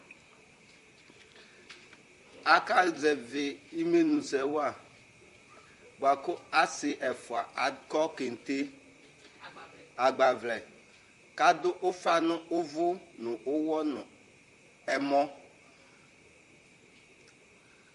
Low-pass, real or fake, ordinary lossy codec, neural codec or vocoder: 14.4 kHz; fake; MP3, 48 kbps; vocoder, 44.1 kHz, 128 mel bands, Pupu-Vocoder